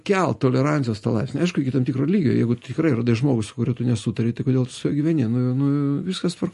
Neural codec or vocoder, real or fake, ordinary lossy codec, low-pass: none; real; MP3, 48 kbps; 14.4 kHz